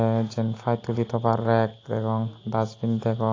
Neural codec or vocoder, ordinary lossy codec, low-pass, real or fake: none; AAC, 32 kbps; 7.2 kHz; real